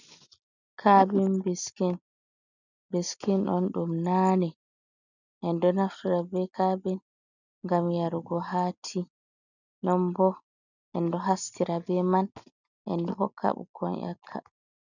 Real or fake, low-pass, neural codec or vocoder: real; 7.2 kHz; none